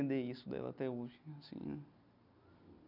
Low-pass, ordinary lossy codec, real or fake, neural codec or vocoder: 5.4 kHz; none; real; none